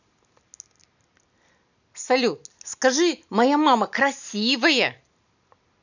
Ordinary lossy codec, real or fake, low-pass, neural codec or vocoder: none; real; 7.2 kHz; none